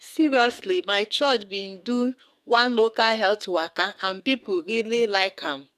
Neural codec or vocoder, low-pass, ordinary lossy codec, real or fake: codec, 44.1 kHz, 2.6 kbps, SNAC; 14.4 kHz; none; fake